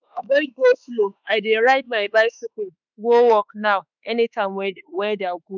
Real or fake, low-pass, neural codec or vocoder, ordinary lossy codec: fake; 7.2 kHz; autoencoder, 48 kHz, 32 numbers a frame, DAC-VAE, trained on Japanese speech; none